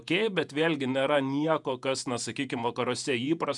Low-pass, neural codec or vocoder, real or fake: 10.8 kHz; vocoder, 48 kHz, 128 mel bands, Vocos; fake